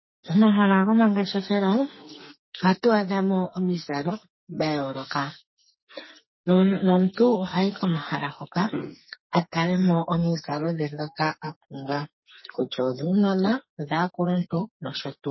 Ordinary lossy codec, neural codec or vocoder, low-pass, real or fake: MP3, 24 kbps; codec, 44.1 kHz, 2.6 kbps, SNAC; 7.2 kHz; fake